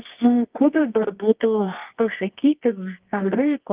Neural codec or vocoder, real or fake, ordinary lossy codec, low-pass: codec, 24 kHz, 0.9 kbps, WavTokenizer, medium music audio release; fake; Opus, 32 kbps; 3.6 kHz